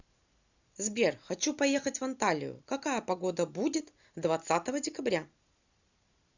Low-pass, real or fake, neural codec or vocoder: 7.2 kHz; real; none